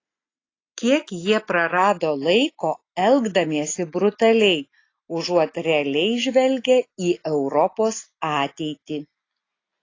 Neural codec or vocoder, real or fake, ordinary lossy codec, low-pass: none; real; AAC, 32 kbps; 7.2 kHz